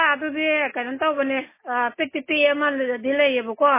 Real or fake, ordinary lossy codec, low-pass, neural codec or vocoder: real; MP3, 16 kbps; 3.6 kHz; none